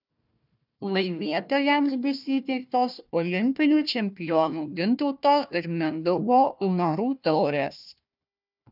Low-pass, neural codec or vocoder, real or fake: 5.4 kHz; codec, 16 kHz, 1 kbps, FunCodec, trained on Chinese and English, 50 frames a second; fake